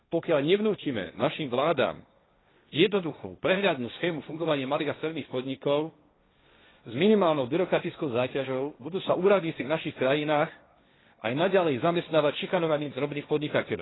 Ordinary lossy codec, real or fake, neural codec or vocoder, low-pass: AAC, 16 kbps; fake; codec, 16 kHz, 1.1 kbps, Voila-Tokenizer; 7.2 kHz